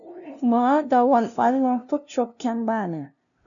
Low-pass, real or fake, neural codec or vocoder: 7.2 kHz; fake; codec, 16 kHz, 0.5 kbps, FunCodec, trained on LibriTTS, 25 frames a second